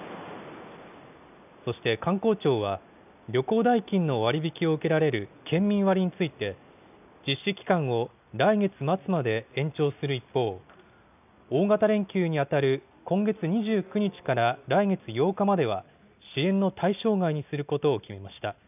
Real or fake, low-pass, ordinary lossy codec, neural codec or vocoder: real; 3.6 kHz; none; none